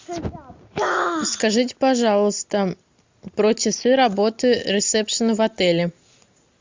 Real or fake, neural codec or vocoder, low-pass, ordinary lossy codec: real; none; 7.2 kHz; MP3, 64 kbps